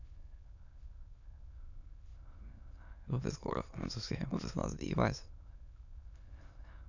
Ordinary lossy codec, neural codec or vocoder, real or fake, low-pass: none; autoencoder, 22.05 kHz, a latent of 192 numbers a frame, VITS, trained on many speakers; fake; 7.2 kHz